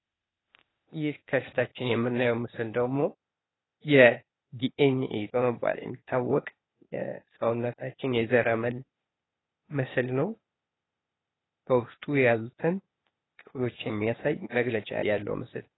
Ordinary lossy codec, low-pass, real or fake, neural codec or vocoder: AAC, 16 kbps; 7.2 kHz; fake; codec, 16 kHz, 0.8 kbps, ZipCodec